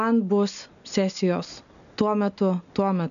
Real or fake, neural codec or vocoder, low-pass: real; none; 7.2 kHz